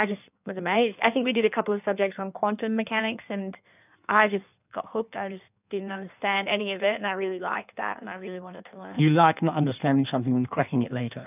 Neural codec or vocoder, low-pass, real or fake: codec, 16 kHz in and 24 kHz out, 1.1 kbps, FireRedTTS-2 codec; 3.6 kHz; fake